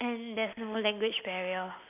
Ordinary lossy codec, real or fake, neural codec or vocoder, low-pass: none; real; none; 3.6 kHz